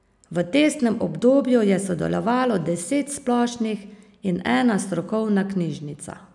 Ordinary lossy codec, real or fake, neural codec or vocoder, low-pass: none; real; none; 10.8 kHz